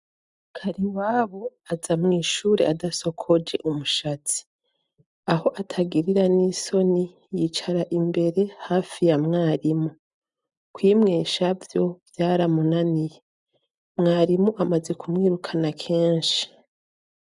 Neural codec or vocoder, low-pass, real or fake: none; 10.8 kHz; real